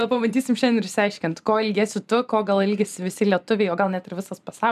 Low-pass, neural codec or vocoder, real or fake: 14.4 kHz; vocoder, 44.1 kHz, 128 mel bands every 512 samples, BigVGAN v2; fake